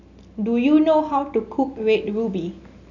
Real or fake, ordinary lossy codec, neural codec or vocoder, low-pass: real; none; none; 7.2 kHz